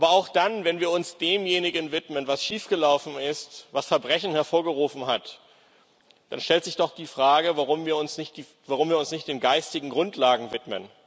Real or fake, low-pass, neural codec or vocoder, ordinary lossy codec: real; none; none; none